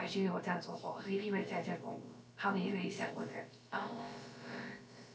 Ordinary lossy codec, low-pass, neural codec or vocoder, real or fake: none; none; codec, 16 kHz, about 1 kbps, DyCAST, with the encoder's durations; fake